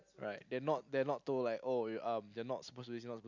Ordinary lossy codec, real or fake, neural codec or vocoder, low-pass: none; real; none; 7.2 kHz